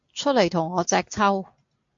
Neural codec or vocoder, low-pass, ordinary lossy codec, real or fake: none; 7.2 kHz; MP3, 48 kbps; real